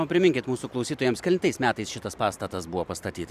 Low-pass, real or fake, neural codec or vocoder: 14.4 kHz; fake; vocoder, 44.1 kHz, 128 mel bands every 256 samples, BigVGAN v2